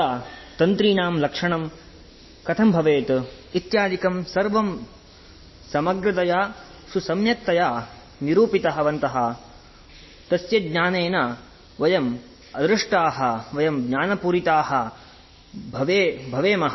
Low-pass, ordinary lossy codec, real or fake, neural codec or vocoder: 7.2 kHz; MP3, 24 kbps; real; none